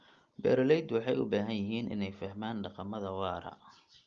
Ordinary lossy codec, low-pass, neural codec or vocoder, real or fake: Opus, 32 kbps; 7.2 kHz; none; real